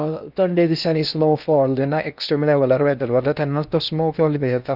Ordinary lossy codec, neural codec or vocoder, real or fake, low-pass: none; codec, 16 kHz in and 24 kHz out, 0.6 kbps, FocalCodec, streaming, 4096 codes; fake; 5.4 kHz